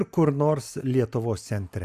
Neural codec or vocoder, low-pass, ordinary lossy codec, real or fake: none; 14.4 kHz; Opus, 64 kbps; real